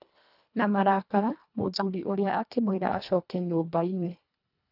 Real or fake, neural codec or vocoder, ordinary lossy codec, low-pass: fake; codec, 24 kHz, 1.5 kbps, HILCodec; none; 5.4 kHz